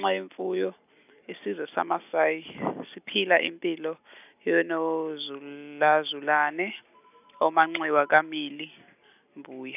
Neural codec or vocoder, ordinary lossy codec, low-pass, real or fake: none; none; 3.6 kHz; real